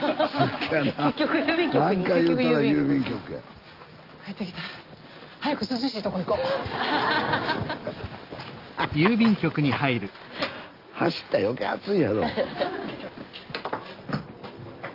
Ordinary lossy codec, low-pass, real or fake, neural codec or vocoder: Opus, 24 kbps; 5.4 kHz; real; none